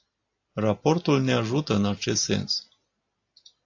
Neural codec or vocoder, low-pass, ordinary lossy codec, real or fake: none; 7.2 kHz; AAC, 48 kbps; real